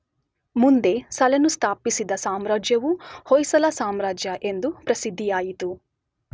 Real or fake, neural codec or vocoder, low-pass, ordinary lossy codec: real; none; none; none